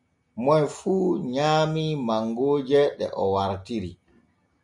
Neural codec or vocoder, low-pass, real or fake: none; 10.8 kHz; real